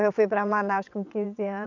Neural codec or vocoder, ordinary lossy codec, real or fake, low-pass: vocoder, 22.05 kHz, 80 mel bands, WaveNeXt; none; fake; 7.2 kHz